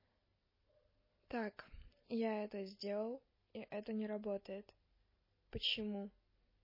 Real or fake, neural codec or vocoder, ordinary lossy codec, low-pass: real; none; MP3, 24 kbps; 5.4 kHz